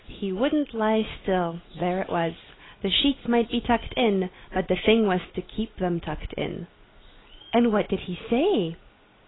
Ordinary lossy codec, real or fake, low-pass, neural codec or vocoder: AAC, 16 kbps; real; 7.2 kHz; none